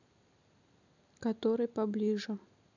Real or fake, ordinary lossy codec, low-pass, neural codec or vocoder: real; none; 7.2 kHz; none